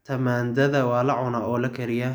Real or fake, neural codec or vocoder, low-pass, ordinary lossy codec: real; none; none; none